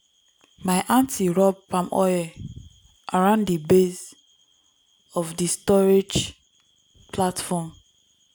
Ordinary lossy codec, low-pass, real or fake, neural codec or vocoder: none; none; real; none